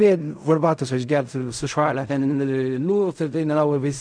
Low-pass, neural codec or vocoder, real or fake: 9.9 kHz; codec, 16 kHz in and 24 kHz out, 0.4 kbps, LongCat-Audio-Codec, fine tuned four codebook decoder; fake